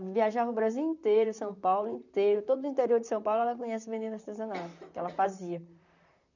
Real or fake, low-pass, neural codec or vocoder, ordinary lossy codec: fake; 7.2 kHz; vocoder, 44.1 kHz, 128 mel bands, Pupu-Vocoder; none